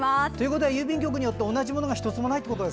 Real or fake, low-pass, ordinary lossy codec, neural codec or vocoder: real; none; none; none